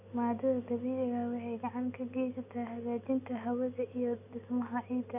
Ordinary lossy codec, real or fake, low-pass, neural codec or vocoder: none; real; 3.6 kHz; none